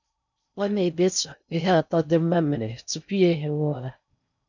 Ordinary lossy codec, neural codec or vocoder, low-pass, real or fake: none; codec, 16 kHz in and 24 kHz out, 0.6 kbps, FocalCodec, streaming, 4096 codes; 7.2 kHz; fake